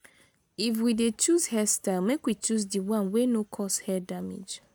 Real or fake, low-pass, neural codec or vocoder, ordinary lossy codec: real; none; none; none